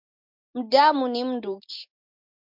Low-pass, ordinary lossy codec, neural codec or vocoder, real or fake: 5.4 kHz; MP3, 48 kbps; none; real